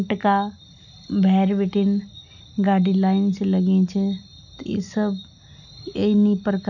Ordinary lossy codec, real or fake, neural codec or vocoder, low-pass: none; real; none; 7.2 kHz